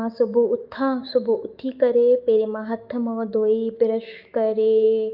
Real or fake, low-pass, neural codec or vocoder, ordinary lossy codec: fake; 5.4 kHz; autoencoder, 48 kHz, 128 numbers a frame, DAC-VAE, trained on Japanese speech; Opus, 24 kbps